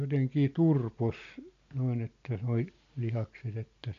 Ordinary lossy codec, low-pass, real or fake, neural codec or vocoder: MP3, 48 kbps; 7.2 kHz; real; none